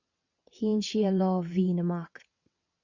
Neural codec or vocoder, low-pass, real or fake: vocoder, 22.05 kHz, 80 mel bands, WaveNeXt; 7.2 kHz; fake